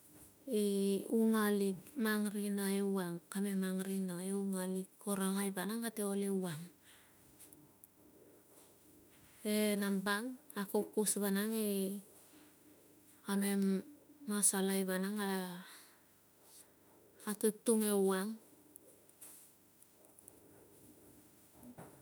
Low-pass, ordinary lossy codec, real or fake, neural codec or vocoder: none; none; fake; autoencoder, 48 kHz, 32 numbers a frame, DAC-VAE, trained on Japanese speech